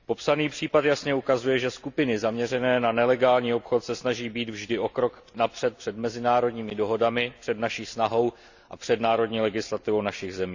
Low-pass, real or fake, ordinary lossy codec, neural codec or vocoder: 7.2 kHz; real; Opus, 64 kbps; none